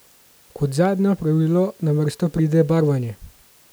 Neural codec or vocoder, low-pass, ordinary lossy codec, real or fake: none; none; none; real